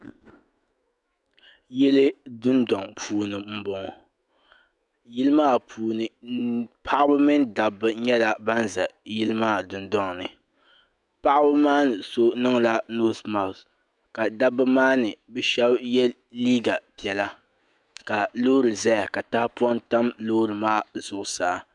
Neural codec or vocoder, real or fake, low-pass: autoencoder, 48 kHz, 128 numbers a frame, DAC-VAE, trained on Japanese speech; fake; 10.8 kHz